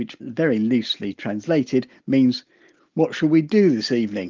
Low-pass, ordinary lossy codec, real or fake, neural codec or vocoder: 7.2 kHz; Opus, 32 kbps; real; none